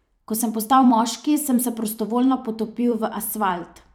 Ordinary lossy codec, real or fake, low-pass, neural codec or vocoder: none; fake; 19.8 kHz; vocoder, 44.1 kHz, 128 mel bands every 256 samples, BigVGAN v2